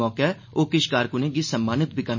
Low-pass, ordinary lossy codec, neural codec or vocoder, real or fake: 7.2 kHz; MP3, 32 kbps; none; real